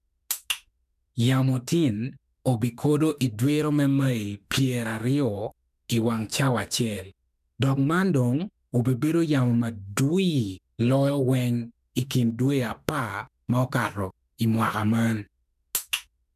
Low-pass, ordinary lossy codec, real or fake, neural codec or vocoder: 14.4 kHz; none; fake; autoencoder, 48 kHz, 32 numbers a frame, DAC-VAE, trained on Japanese speech